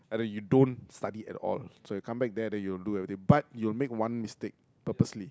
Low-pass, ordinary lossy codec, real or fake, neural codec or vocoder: none; none; real; none